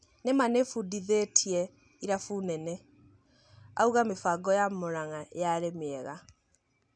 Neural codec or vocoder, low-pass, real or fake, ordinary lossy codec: none; 9.9 kHz; real; none